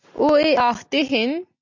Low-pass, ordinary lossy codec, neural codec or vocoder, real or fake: 7.2 kHz; MP3, 64 kbps; none; real